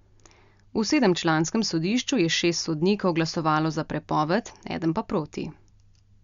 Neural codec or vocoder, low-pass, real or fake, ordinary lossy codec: none; 7.2 kHz; real; Opus, 64 kbps